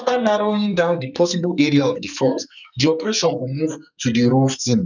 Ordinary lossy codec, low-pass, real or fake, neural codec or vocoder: none; 7.2 kHz; fake; codec, 44.1 kHz, 2.6 kbps, SNAC